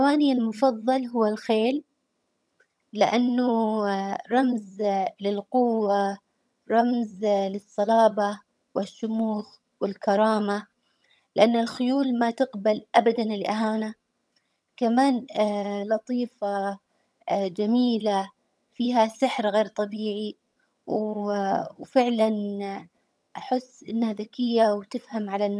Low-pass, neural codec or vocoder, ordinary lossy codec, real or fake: none; vocoder, 22.05 kHz, 80 mel bands, HiFi-GAN; none; fake